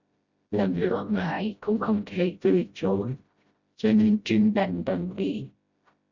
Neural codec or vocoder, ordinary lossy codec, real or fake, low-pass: codec, 16 kHz, 0.5 kbps, FreqCodec, smaller model; Opus, 64 kbps; fake; 7.2 kHz